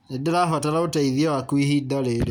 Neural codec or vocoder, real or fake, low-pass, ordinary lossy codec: none; real; 19.8 kHz; none